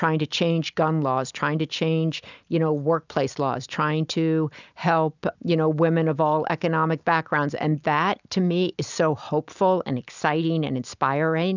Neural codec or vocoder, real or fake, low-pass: none; real; 7.2 kHz